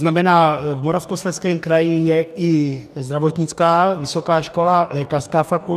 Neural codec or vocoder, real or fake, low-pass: codec, 44.1 kHz, 2.6 kbps, DAC; fake; 14.4 kHz